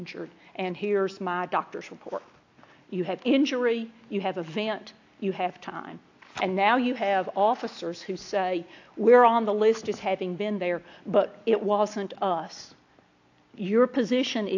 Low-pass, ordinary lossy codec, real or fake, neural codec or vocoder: 7.2 kHz; AAC, 48 kbps; real; none